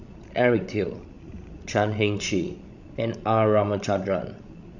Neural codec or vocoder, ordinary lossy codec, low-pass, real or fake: codec, 16 kHz, 16 kbps, FreqCodec, larger model; none; 7.2 kHz; fake